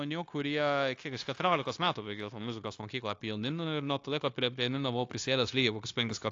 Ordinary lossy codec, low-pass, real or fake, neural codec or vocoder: AAC, 48 kbps; 7.2 kHz; fake; codec, 16 kHz, 0.9 kbps, LongCat-Audio-Codec